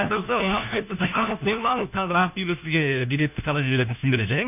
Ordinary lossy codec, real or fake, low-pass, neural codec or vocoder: none; fake; 3.6 kHz; codec, 16 kHz, 1 kbps, FunCodec, trained on LibriTTS, 50 frames a second